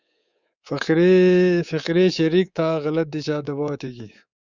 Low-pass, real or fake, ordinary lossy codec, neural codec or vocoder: 7.2 kHz; fake; Opus, 64 kbps; codec, 24 kHz, 3.1 kbps, DualCodec